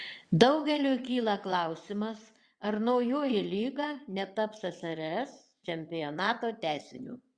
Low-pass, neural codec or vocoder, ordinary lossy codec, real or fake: 9.9 kHz; vocoder, 22.05 kHz, 80 mel bands, WaveNeXt; Opus, 64 kbps; fake